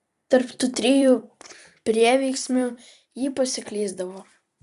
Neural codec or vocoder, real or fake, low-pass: vocoder, 44.1 kHz, 128 mel bands every 256 samples, BigVGAN v2; fake; 14.4 kHz